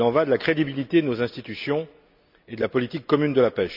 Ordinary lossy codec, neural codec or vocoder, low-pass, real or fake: none; none; 5.4 kHz; real